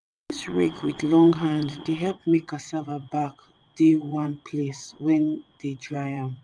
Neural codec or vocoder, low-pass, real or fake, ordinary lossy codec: vocoder, 22.05 kHz, 80 mel bands, WaveNeXt; 9.9 kHz; fake; none